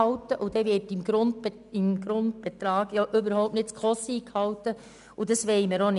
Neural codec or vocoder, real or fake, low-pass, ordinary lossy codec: none; real; 10.8 kHz; none